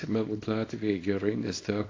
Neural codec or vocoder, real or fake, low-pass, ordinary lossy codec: codec, 24 kHz, 0.9 kbps, WavTokenizer, small release; fake; 7.2 kHz; AAC, 32 kbps